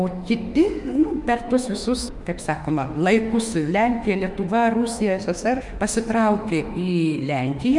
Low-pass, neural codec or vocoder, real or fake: 10.8 kHz; autoencoder, 48 kHz, 32 numbers a frame, DAC-VAE, trained on Japanese speech; fake